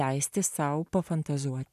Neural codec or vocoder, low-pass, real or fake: none; 14.4 kHz; real